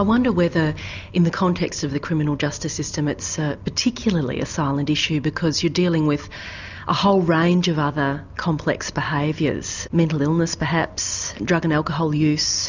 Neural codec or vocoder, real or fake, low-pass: none; real; 7.2 kHz